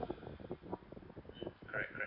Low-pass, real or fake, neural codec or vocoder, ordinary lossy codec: 5.4 kHz; real; none; none